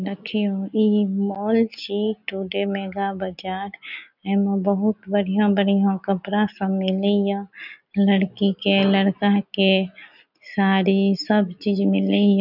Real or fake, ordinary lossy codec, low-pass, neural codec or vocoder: real; none; 5.4 kHz; none